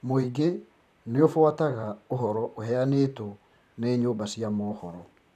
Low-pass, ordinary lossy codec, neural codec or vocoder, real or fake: 14.4 kHz; none; vocoder, 44.1 kHz, 128 mel bands, Pupu-Vocoder; fake